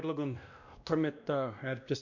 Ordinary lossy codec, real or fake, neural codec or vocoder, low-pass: none; fake; codec, 16 kHz, 1 kbps, X-Codec, WavLM features, trained on Multilingual LibriSpeech; 7.2 kHz